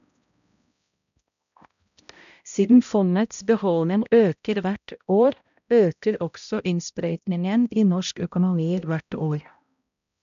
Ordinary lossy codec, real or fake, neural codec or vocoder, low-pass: none; fake; codec, 16 kHz, 0.5 kbps, X-Codec, HuBERT features, trained on balanced general audio; 7.2 kHz